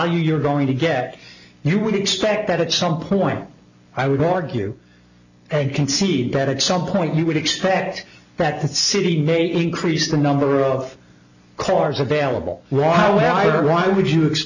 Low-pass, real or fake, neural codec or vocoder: 7.2 kHz; real; none